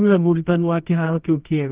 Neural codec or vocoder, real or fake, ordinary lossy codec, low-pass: codec, 24 kHz, 0.9 kbps, WavTokenizer, medium music audio release; fake; Opus, 32 kbps; 3.6 kHz